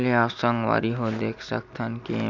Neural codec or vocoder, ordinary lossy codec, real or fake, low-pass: none; none; real; 7.2 kHz